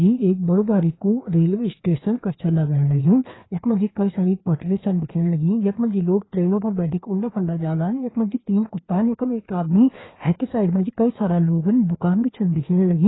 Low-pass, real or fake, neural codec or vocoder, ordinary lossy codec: 7.2 kHz; fake; codec, 16 kHz, 2 kbps, FreqCodec, larger model; AAC, 16 kbps